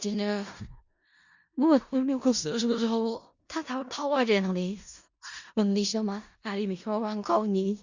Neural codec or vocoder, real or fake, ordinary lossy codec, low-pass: codec, 16 kHz in and 24 kHz out, 0.4 kbps, LongCat-Audio-Codec, four codebook decoder; fake; Opus, 64 kbps; 7.2 kHz